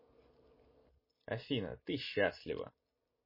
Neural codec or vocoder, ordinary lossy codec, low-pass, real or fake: none; MP3, 24 kbps; 5.4 kHz; real